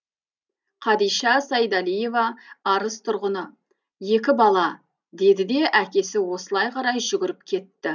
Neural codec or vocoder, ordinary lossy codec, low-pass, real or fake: none; none; 7.2 kHz; real